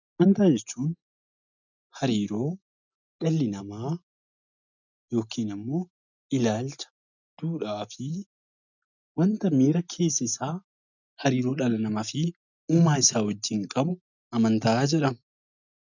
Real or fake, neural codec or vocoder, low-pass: real; none; 7.2 kHz